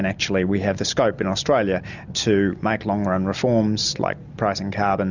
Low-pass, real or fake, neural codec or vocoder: 7.2 kHz; real; none